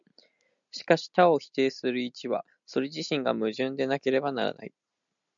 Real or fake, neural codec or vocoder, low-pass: real; none; 7.2 kHz